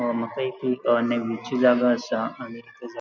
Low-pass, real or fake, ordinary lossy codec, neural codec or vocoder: 7.2 kHz; real; none; none